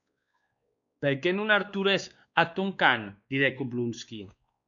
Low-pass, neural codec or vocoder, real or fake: 7.2 kHz; codec, 16 kHz, 2 kbps, X-Codec, WavLM features, trained on Multilingual LibriSpeech; fake